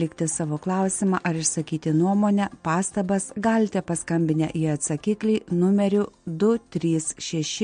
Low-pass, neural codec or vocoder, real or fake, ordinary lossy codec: 9.9 kHz; none; real; MP3, 48 kbps